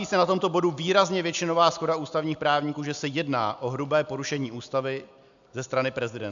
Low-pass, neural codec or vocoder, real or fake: 7.2 kHz; none; real